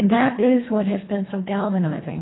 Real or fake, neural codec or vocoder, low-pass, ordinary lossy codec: fake; codec, 24 kHz, 1.5 kbps, HILCodec; 7.2 kHz; AAC, 16 kbps